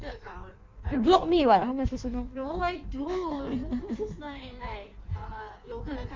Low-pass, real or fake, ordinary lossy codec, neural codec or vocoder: 7.2 kHz; fake; MP3, 64 kbps; codec, 16 kHz in and 24 kHz out, 1.1 kbps, FireRedTTS-2 codec